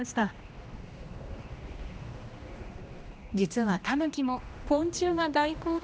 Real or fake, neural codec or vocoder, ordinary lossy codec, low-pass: fake; codec, 16 kHz, 1 kbps, X-Codec, HuBERT features, trained on general audio; none; none